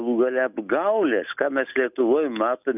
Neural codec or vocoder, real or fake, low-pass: none; real; 3.6 kHz